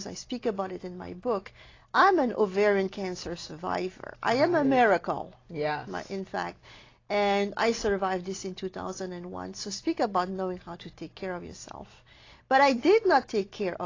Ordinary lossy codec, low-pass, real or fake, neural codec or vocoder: AAC, 32 kbps; 7.2 kHz; real; none